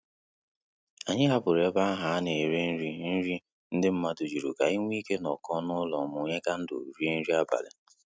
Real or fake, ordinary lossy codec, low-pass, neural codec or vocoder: real; none; none; none